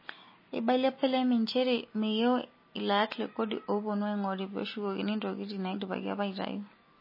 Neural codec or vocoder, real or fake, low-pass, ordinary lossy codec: none; real; 5.4 kHz; MP3, 24 kbps